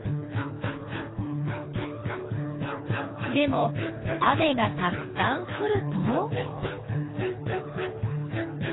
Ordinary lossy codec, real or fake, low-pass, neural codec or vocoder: AAC, 16 kbps; fake; 7.2 kHz; codec, 24 kHz, 3 kbps, HILCodec